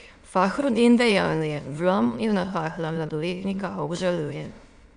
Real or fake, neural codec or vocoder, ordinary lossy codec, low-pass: fake; autoencoder, 22.05 kHz, a latent of 192 numbers a frame, VITS, trained on many speakers; none; 9.9 kHz